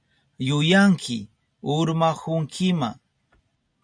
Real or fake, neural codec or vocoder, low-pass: real; none; 9.9 kHz